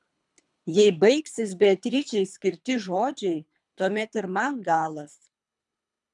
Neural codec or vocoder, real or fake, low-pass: codec, 24 kHz, 3 kbps, HILCodec; fake; 10.8 kHz